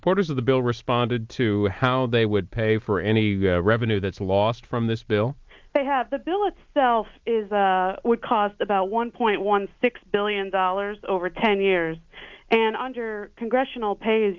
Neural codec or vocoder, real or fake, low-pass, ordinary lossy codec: none; real; 7.2 kHz; Opus, 24 kbps